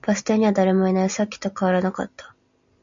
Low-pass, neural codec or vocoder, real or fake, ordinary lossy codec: 7.2 kHz; none; real; MP3, 64 kbps